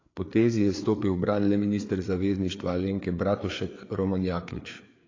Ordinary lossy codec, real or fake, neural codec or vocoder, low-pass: AAC, 32 kbps; fake; codec, 16 kHz, 4 kbps, FreqCodec, larger model; 7.2 kHz